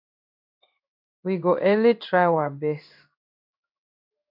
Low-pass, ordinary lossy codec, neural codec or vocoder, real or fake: 5.4 kHz; MP3, 48 kbps; codec, 16 kHz in and 24 kHz out, 1 kbps, XY-Tokenizer; fake